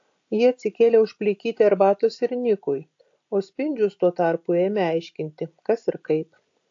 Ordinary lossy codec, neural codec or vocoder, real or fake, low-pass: AAC, 48 kbps; none; real; 7.2 kHz